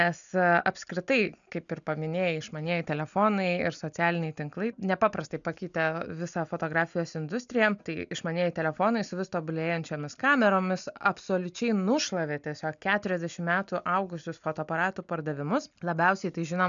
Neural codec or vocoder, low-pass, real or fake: none; 7.2 kHz; real